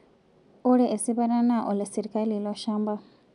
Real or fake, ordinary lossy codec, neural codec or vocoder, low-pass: real; none; none; 10.8 kHz